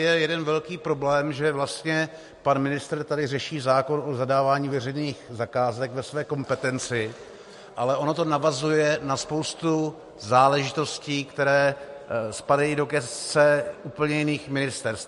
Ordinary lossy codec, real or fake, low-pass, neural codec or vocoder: MP3, 48 kbps; real; 14.4 kHz; none